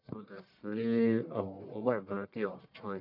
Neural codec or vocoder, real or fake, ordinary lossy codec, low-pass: codec, 44.1 kHz, 1.7 kbps, Pupu-Codec; fake; none; 5.4 kHz